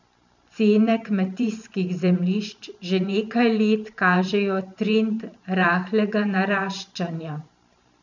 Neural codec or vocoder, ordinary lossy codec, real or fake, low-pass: vocoder, 44.1 kHz, 128 mel bands every 512 samples, BigVGAN v2; none; fake; 7.2 kHz